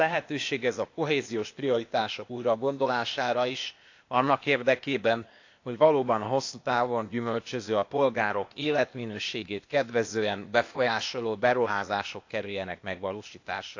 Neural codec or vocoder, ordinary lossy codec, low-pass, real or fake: codec, 16 kHz, 0.8 kbps, ZipCodec; AAC, 48 kbps; 7.2 kHz; fake